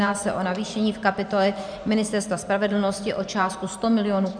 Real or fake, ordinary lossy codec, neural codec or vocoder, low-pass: fake; MP3, 96 kbps; vocoder, 44.1 kHz, 128 mel bands every 512 samples, BigVGAN v2; 9.9 kHz